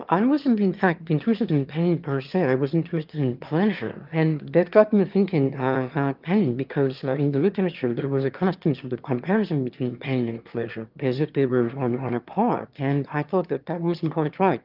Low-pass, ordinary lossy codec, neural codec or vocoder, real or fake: 5.4 kHz; Opus, 24 kbps; autoencoder, 22.05 kHz, a latent of 192 numbers a frame, VITS, trained on one speaker; fake